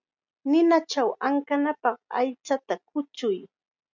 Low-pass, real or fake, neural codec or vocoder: 7.2 kHz; real; none